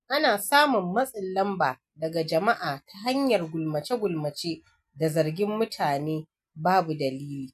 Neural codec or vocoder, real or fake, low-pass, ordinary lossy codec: none; real; 14.4 kHz; none